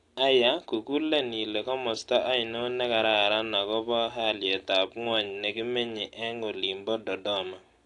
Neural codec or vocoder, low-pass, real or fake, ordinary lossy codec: none; 10.8 kHz; real; AAC, 48 kbps